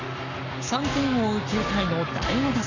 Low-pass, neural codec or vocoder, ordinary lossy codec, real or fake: 7.2 kHz; codec, 44.1 kHz, 7.8 kbps, Pupu-Codec; none; fake